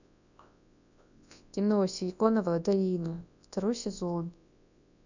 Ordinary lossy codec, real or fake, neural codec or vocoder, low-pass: none; fake; codec, 24 kHz, 0.9 kbps, WavTokenizer, large speech release; 7.2 kHz